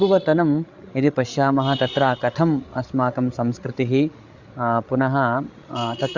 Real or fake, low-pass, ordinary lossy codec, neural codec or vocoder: fake; 7.2 kHz; Opus, 64 kbps; codec, 16 kHz, 8 kbps, FreqCodec, larger model